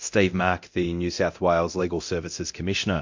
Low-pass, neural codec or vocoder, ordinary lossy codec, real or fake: 7.2 kHz; codec, 24 kHz, 0.9 kbps, DualCodec; MP3, 48 kbps; fake